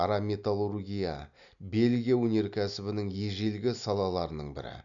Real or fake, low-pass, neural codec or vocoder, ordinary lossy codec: real; 7.2 kHz; none; none